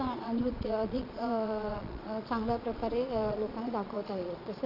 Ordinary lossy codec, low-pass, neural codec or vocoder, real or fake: none; 5.4 kHz; vocoder, 22.05 kHz, 80 mel bands, Vocos; fake